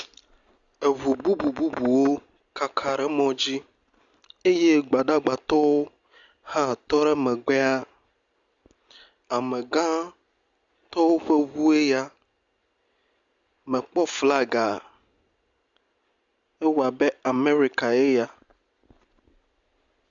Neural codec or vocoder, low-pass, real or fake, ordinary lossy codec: none; 7.2 kHz; real; Opus, 64 kbps